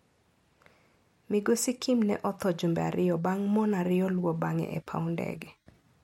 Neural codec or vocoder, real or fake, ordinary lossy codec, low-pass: none; real; MP3, 64 kbps; 19.8 kHz